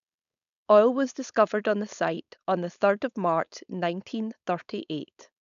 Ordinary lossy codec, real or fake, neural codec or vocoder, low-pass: none; fake; codec, 16 kHz, 4.8 kbps, FACodec; 7.2 kHz